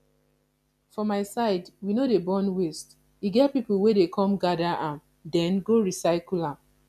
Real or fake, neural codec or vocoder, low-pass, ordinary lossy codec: real; none; 14.4 kHz; none